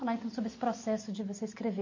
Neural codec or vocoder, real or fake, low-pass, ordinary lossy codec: none; real; 7.2 kHz; MP3, 32 kbps